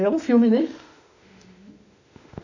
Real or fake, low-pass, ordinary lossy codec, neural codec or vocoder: fake; 7.2 kHz; none; autoencoder, 48 kHz, 32 numbers a frame, DAC-VAE, trained on Japanese speech